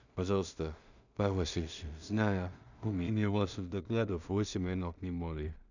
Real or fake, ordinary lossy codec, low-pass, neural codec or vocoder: fake; none; 7.2 kHz; codec, 16 kHz in and 24 kHz out, 0.4 kbps, LongCat-Audio-Codec, two codebook decoder